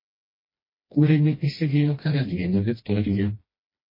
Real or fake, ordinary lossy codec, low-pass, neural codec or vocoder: fake; MP3, 24 kbps; 5.4 kHz; codec, 16 kHz, 1 kbps, FreqCodec, smaller model